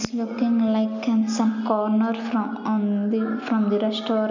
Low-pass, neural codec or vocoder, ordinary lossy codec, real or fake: 7.2 kHz; none; none; real